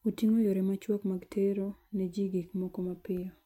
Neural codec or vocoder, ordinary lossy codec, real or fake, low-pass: none; MP3, 64 kbps; real; 19.8 kHz